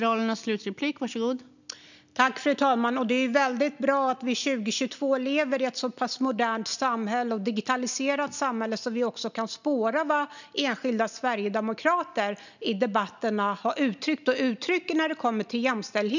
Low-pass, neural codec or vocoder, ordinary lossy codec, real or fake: 7.2 kHz; none; none; real